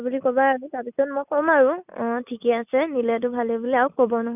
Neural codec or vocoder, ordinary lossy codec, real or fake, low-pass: none; none; real; 3.6 kHz